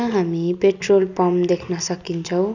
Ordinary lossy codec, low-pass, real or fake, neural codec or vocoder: none; 7.2 kHz; real; none